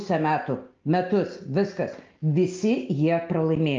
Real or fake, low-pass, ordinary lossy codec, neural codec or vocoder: real; 7.2 kHz; Opus, 24 kbps; none